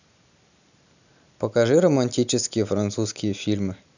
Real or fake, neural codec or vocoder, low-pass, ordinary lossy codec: real; none; 7.2 kHz; none